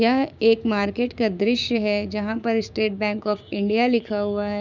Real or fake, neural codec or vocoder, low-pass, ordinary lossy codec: fake; codec, 16 kHz, 6 kbps, DAC; 7.2 kHz; none